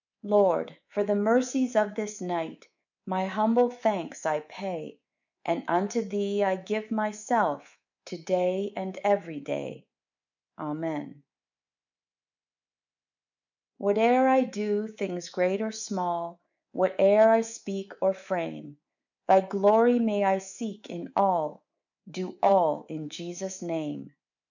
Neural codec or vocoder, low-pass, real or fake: codec, 24 kHz, 3.1 kbps, DualCodec; 7.2 kHz; fake